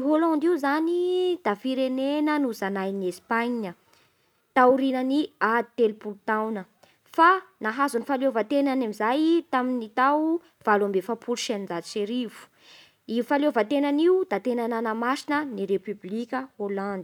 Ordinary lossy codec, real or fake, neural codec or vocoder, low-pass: none; real; none; 19.8 kHz